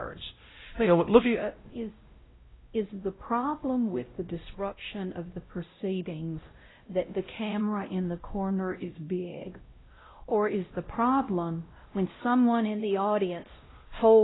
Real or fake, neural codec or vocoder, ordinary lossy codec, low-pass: fake; codec, 16 kHz, 0.5 kbps, X-Codec, WavLM features, trained on Multilingual LibriSpeech; AAC, 16 kbps; 7.2 kHz